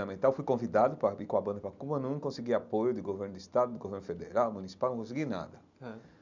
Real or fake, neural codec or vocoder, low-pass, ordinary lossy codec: real; none; 7.2 kHz; none